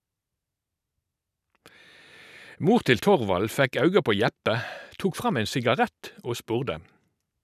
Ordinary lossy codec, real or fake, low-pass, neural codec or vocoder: none; real; 14.4 kHz; none